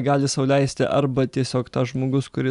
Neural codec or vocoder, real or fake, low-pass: none; real; 10.8 kHz